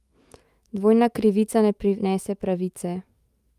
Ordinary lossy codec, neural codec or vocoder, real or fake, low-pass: Opus, 32 kbps; autoencoder, 48 kHz, 128 numbers a frame, DAC-VAE, trained on Japanese speech; fake; 14.4 kHz